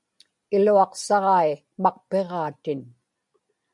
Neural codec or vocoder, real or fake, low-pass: none; real; 10.8 kHz